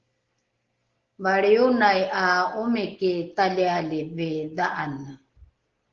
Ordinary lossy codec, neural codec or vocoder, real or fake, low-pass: Opus, 16 kbps; none; real; 7.2 kHz